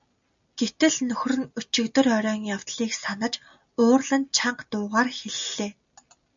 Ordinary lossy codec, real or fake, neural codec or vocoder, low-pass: MP3, 64 kbps; real; none; 7.2 kHz